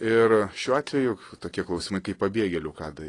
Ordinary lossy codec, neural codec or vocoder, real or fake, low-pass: AAC, 32 kbps; none; real; 10.8 kHz